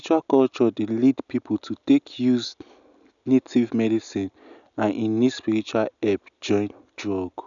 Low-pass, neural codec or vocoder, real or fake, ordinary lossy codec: 7.2 kHz; none; real; none